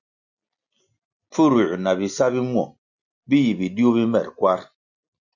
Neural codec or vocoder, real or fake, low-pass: none; real; 7.2 kHz